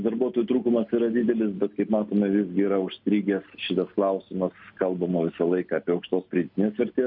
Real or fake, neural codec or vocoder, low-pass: real; none; 5.4 kHz